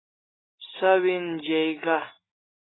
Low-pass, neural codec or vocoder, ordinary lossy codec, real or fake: 7.2 kHz; none; AAC, 16 kbps; real